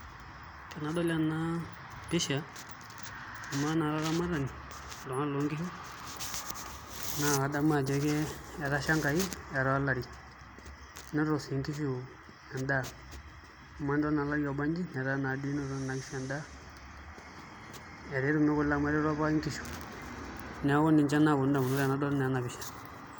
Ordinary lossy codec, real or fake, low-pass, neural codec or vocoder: none; real; none; none